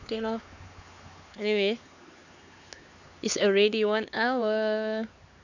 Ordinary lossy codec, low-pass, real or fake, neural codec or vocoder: none; 7.2 kHz; fake; codec, 16 kHz, 4 kbps, X-Codec, HuBERT features, trained on LibriSpeech